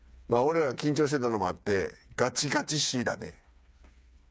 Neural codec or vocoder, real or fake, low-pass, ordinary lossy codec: codec, 16 kHz, 4 kbps, FreqCodec, smaller model; fake; none; none